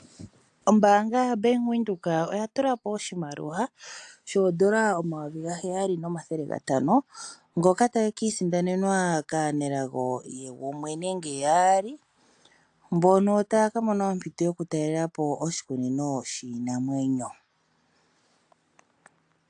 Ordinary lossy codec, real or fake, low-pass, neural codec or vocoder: AAC, 64 kbps; real; 9.9 kHz; none